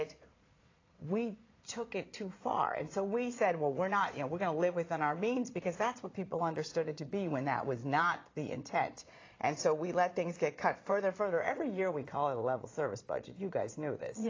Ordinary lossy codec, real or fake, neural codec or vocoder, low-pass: AAC, 32 kbps; fake; vocoder, 22.05 kHz, 80 mel bands, Vocos; 7.2 kHz